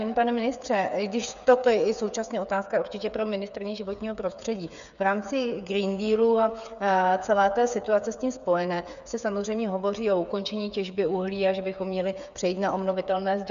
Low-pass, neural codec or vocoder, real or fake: 7.2 kHz; codec, 16 kHz, 8 kbps, FreqCodec, smaller model; fake